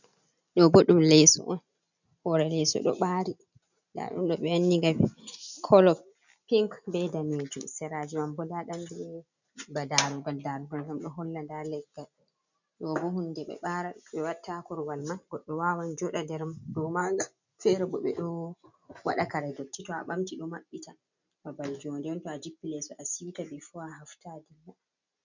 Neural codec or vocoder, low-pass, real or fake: none; 7.2 kHz; real